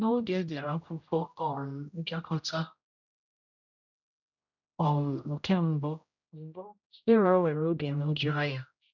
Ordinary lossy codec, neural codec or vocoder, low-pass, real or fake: none; codec, 16 kHz, 0.5 kbps, X-Codec, HuBERT features, trained on general audio; 7.2 kHz; fake